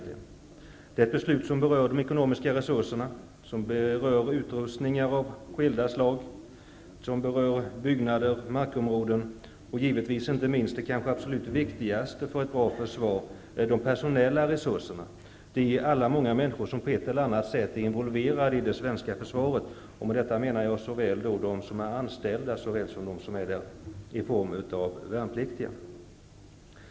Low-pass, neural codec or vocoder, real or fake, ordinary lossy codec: none; none; real; none